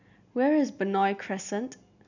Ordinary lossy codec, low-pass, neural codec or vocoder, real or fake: none; 7.2 kHz; none; real